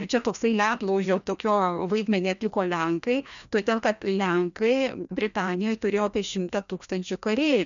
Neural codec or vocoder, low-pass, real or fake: codec, 16 kHz, 1 kbps, FreqCodec, larger model; 7.2 kHz; fake